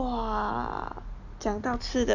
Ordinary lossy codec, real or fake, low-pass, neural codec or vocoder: none; real; 7.2 kHz; none